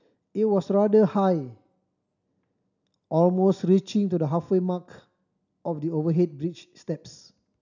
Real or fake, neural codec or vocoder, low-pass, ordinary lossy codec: real; none; 7.2 kHz; none